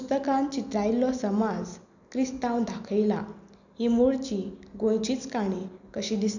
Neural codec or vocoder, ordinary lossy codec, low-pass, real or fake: none; none; 7.2 kHz; real